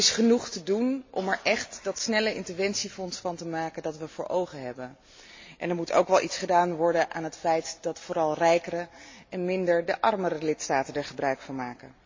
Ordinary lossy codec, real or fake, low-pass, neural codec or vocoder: MP3, 32 kbps; real; 7.2 kHz; none